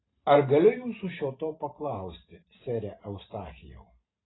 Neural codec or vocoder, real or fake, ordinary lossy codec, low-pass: vocoder, 44.1 kHz, 128 mel bands every 512 samples, BigVGAN v2; fake; AAC, 16 kbps; 7.2 kHz